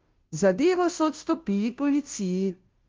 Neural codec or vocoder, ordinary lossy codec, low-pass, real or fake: codec, 16 kHz, 0.5 kbps, FunCodec, trained on Chinese and English, 25 frames a second; Opus, 24 kbps; 7.2 kHz; fake